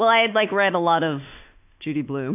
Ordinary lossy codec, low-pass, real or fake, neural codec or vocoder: AAC, 32 kbps; 3.6 kHz; fake; autoencoder, 48 kHz, 32 numbers a frame, DAC-VAE, trained on Japanese speech